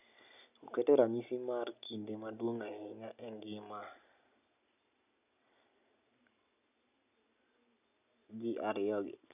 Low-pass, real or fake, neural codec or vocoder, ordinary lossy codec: 3.6 kHz; real; none; none